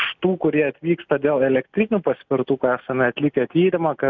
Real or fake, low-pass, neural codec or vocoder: real; 7.2 kHz; none